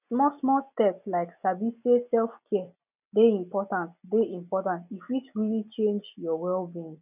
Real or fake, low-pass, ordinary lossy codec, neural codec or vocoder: real; 3.6 kHz; none; none